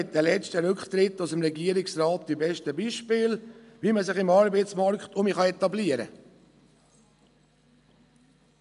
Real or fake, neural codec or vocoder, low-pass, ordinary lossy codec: real; none; 10.8 kHz; none